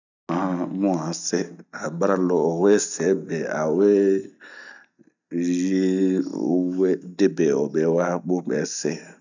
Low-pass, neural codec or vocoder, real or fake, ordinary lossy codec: 7.2 kHz; none; real; none